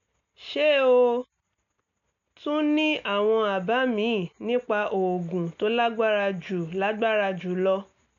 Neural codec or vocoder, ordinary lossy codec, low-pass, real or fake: none; none; 7.2 kHz; real